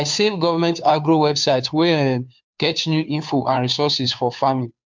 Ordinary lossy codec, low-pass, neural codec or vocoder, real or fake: MP3, 64 kbps; 7.2 kHz; codec, 16 kHz, 2 kbps, FunCodec, trained on Chinese and English, 25 frames a second; fake